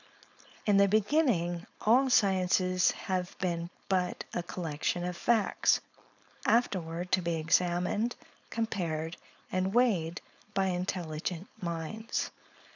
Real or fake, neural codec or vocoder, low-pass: fake; codec, 16 kHz, 4.8 kbps, FACodec; 7.2 kHz